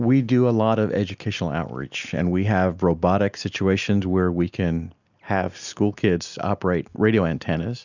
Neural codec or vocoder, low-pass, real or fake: none; 7.2 kHz; real